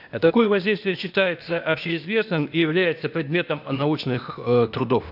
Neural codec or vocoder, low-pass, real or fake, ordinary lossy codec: codec, 16 kHz, 0.8 kbps, ZipCodec; 5.4 kHz; fake; none